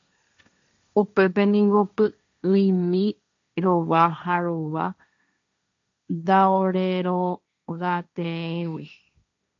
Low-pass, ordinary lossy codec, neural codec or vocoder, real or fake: 7.2 kHz; AAC, 64 kbps; codec, 16 kHz, 1.1 kbps, Voila-Tokenizer; fake